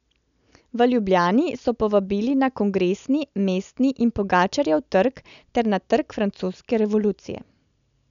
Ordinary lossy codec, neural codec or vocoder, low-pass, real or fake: none; none; 7.2 kHz; real